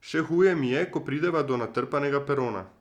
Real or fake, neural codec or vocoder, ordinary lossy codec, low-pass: real; none; Opus, 64 kbps; 19.8 kHz